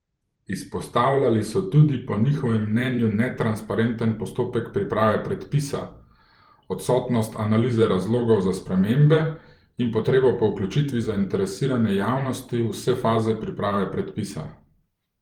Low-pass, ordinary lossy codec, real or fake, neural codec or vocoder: 19.8 kHz; Opus, 24 kbps; fake; vocoder, 44.1 kHz, 128 mel bands every 512 samples, BigVGAN v2